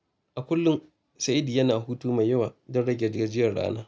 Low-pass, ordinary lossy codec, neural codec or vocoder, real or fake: none; none; none; real